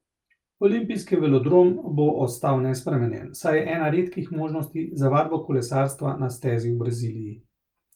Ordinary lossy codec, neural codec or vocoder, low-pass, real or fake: Opus, 32 kbps; none; 19.8 kHz; real